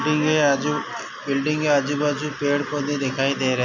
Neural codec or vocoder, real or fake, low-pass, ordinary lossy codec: none; real; 7.2 kHz; MP3, 64 kbps